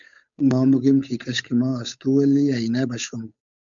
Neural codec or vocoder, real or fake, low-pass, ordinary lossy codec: codec, 16 kHz, 8 kbps, FunCodec, trained on Chinese and English, 25 frames a second; fake; 7.2 kHz; MP3, 96 kbps